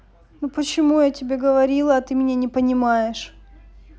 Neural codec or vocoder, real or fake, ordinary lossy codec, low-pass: none; real; none; none